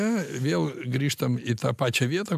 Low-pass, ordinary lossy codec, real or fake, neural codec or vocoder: 14.4 kHz; MP3, 96 kbps; real; none